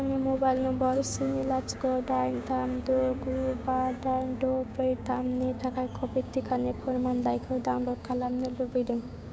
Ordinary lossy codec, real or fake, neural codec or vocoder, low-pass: none; fake; codec, 16 kHz, 6 kbps, DAC; none